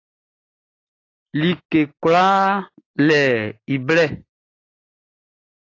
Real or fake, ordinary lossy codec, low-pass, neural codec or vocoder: real; AAC, 32 kbps; 7.2 kHz; none